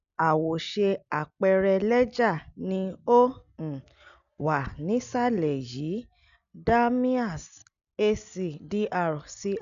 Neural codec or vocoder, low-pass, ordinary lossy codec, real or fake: none; 7.2 kHz; none; real